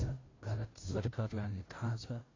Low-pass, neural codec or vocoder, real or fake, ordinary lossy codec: 7.2 kHz; codec, 16 kHz, 0.5 kbps, FunCodec, trained on Chinese and English, 25 frames a second; fake; AAC, 32 kbps